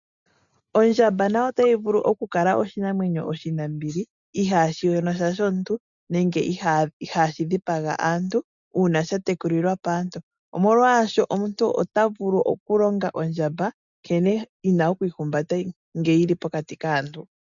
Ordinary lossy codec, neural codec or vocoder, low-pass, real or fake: MP3, 96 kbps; none; 7.2 kHz; real